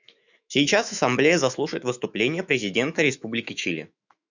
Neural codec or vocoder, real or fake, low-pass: autoencoder, 48 kHz, 128 numbers a frame, DAC-VAE, trained on Japanese speech; fake; 7.2 kHz